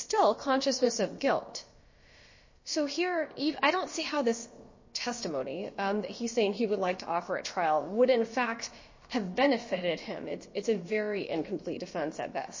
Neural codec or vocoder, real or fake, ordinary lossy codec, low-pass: codec, 16 kHz, about 1 kbps, DyCAST, with the encoder's durations; fake; MP3, 32 kbps; 7.2 kHz